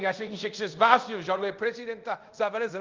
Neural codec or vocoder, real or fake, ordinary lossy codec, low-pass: codec, 24 kHz, 0.5 kbps, DualCodec; fake; Opus, 24 kbps; 7.2 kHz